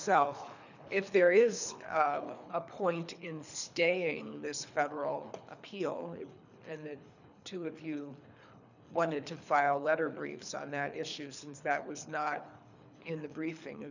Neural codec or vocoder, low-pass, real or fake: codec, 24 kHz, 3 kbps, HILCodec; 7.2 kHz; fake